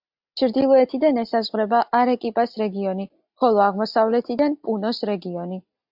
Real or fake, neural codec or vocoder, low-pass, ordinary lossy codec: real; none; 5.4 kHz; AAC, 48 kbps